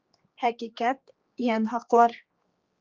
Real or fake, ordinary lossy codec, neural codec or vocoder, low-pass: fake; Opus, 24 kbps; codec, 16 kHz, 2 kbps, X-Codec, HuBERT features, trained on general audio; 7.2 kHz